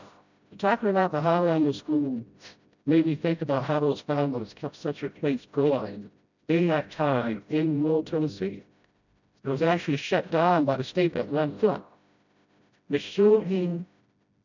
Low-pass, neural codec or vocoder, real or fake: 7.2 kHz; codec, 16 kHz, 0.5 kbps, FreqCodec, smaller model; fake